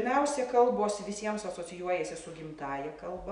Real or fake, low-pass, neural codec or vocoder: real; 9.9 kHz; none